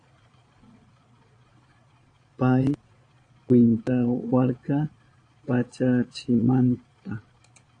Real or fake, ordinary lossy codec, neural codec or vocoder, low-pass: fake; AAC, 48 kbps; vocoder, 22.05 kHz, 80 mel bands, Vocos; 9.9 kHz